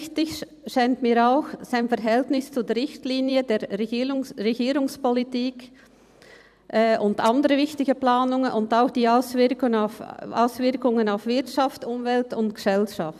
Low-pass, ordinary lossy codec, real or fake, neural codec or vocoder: 14.4 kHz; none; fake; vocoder, 44.1 kHz, 128 mel bands every 256 samples, BigVGAN v2